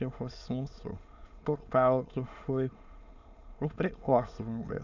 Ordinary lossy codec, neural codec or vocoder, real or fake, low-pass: none; autoencoder, 22.05 kHz, a latent of 192 numbers a frame, VITS, trained on many speakers; fake; 7.2 kHz